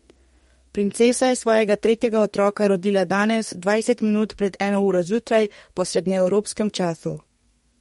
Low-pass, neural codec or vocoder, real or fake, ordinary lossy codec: 14.4 kHz; codec, 32 kHz, 1.9 kbps, SNAC; fake; MP3, 48 kbps